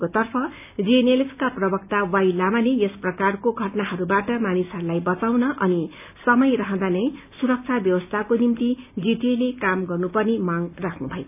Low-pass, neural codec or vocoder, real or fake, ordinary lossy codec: 3.6 kHz; none; real; AAC, 32 kbps